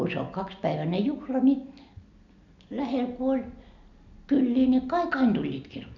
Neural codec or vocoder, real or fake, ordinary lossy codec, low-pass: none; real; none; 7.2 kHz